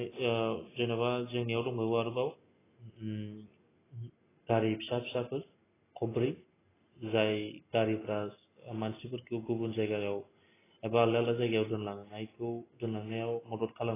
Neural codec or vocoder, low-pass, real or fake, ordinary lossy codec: none; 3.6 kHz; real; AAC, 16 kbps